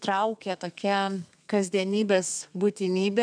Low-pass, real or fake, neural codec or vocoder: 9.9 kHz; fake; codec, 32 kHz, 1.9 kbps, SNAC